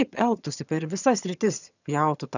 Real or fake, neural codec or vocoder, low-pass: fake; vocoder, 22.05 kHz, 80 mel bands, HiFi-GAN; 7.2 kHz